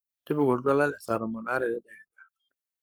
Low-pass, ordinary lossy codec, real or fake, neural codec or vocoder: none; none; fake; codec, 44.1 kHz, 7.8 kbps, Pupu-Codec